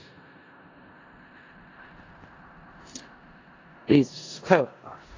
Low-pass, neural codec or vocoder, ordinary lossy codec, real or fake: 7.2 kHz; codec, 16 kHz in and 24 kHz out, 0.4 kbps, LongCat-Audio-Codec, four codebook decoder; AAC, 32 kbps; fake